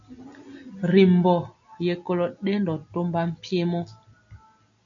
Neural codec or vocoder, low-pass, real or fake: none; 7.2 kHz; real